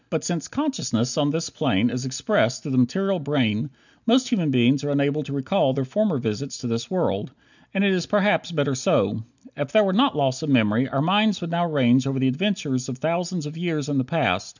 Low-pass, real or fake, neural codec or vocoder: 7.2 kHz; real; none